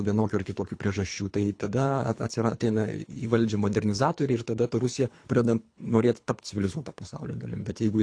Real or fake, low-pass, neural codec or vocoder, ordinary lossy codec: fake; 9.9 kHz; codec, 24 kHz, 3 kbps, HILCodec; AAC, 48 kbps